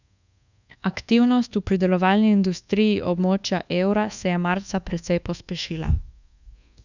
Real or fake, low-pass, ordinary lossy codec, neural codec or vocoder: fake; 7.2 kHz; none; codec, 24 kHz, 1.2 kbps, DualCodec